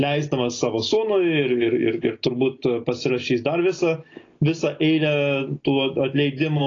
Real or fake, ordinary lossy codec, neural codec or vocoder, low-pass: real; AAC, 32 kbps; none; 7.2 kHz